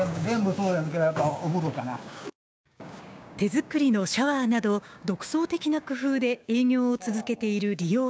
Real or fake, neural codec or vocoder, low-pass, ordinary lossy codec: fake; codec, 16 kHz, 6 kbps, DAC; none; none